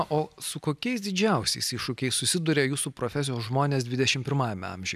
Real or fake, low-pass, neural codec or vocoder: real; 14.4 kHz; none